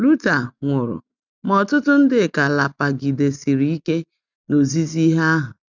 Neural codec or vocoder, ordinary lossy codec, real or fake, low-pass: none; none; real; 7.2 kHz